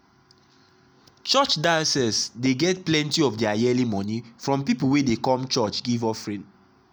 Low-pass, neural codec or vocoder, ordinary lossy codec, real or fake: none; none; none; real